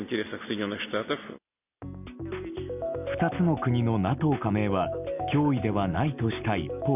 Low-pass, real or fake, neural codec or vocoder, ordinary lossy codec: 3.6 kHz; real; none; none